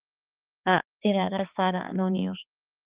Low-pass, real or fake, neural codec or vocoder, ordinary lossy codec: 3.6 kHz; fake; codec, 16 kHz, 4 kbps, X-Codec, HuBERT features, trained on balanced general audio; Opus, 24 kbps